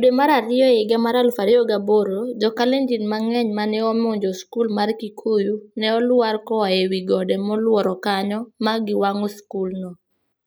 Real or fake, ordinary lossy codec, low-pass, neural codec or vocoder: real; none; none; none